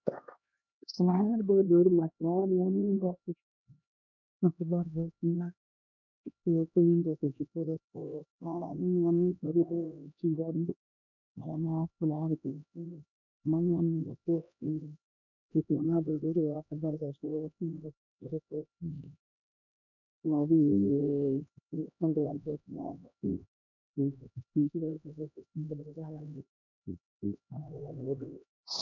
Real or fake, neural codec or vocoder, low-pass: fake; codec, 16 kHz, 1 kbps, X-Codec, HuBERT features, trained on LibriSpeech; 7.2 kHz